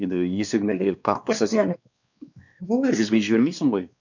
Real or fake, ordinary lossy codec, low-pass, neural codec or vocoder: fake; none; 7.2 kHz; codec, 16 kHz, 2 kbps, X-Codec, WavLM features, trained on Multilingual LibriSpeech